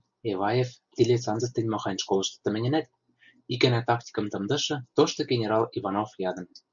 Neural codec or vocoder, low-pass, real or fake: none; 7.2 kHz; real